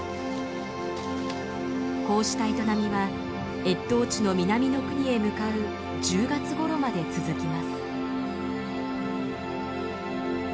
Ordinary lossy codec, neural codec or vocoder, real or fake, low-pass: none; none; real; none